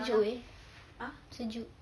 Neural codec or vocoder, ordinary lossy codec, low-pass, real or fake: none; none; none; real